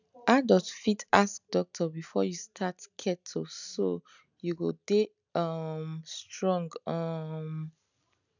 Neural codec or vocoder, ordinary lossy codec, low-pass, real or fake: none; none; 7.2 kHz; real